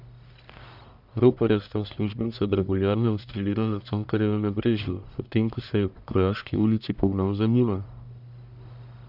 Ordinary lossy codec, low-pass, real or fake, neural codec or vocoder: none; 5.4 kHz; fake; codec, 44.1 kHz, 1.7 kbps, Pupu-Codec